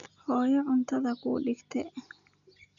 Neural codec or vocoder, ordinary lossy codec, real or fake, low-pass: none; none; real; 7.2 kHz